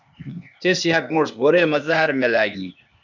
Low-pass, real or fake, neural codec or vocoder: 7.2 kHz; fake; codec, 16 kHz, 0.8 kbps, ZipCodec